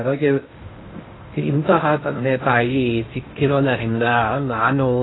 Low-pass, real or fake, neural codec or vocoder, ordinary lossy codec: 7.2 kHz; fake; codec, 16 kHz in and 24 kHz out, 0.6 kbps, FocalCodec, streaming, 2048 codes; AAC, 16 kbps